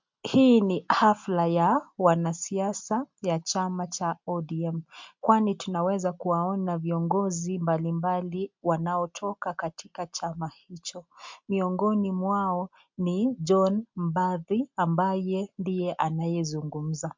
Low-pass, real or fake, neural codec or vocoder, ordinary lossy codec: 7.2 kHz; real; none; MP3, 64 kbps